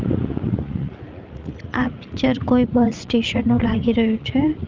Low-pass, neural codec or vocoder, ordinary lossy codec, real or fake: 7.2 kHz; vocoder, 44.1 kHz, 80 mel bands, Vocos; Opus, 24 kbps; fake